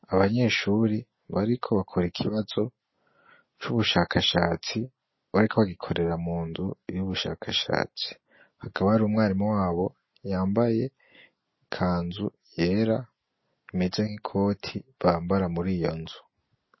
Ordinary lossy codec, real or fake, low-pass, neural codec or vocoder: MP3, 24 kbps; real; 7.2 kHz; none